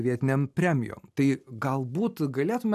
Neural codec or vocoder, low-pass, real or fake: none; 14.4 kHz; real